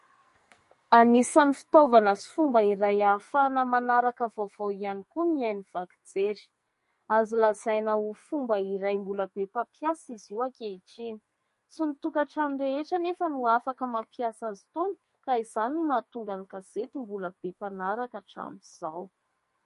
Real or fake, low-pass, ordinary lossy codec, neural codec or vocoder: fake; 14.4 kHz; MP3, 48 kbps; codec, 44.1 kHz, 2.6 kbps, SNAC